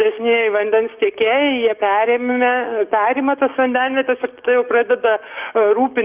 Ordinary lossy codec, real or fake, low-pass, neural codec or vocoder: Opus, 32 kbps; fake; 3.6 kHz; codec, 44.1 kHz, 7.8 kbps, DAC